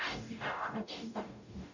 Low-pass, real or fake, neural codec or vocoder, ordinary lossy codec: 7.2 kHz; fake; codec, 44.1 kHz, 0.9 kbps, DAC; none